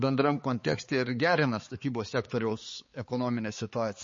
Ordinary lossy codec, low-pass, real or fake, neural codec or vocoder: MP3, 32 kbps; 7.2 kHz; fake; codec, 16 kHz, 4 kbps, X-Codec, HuBERT features, trained on balanced general audio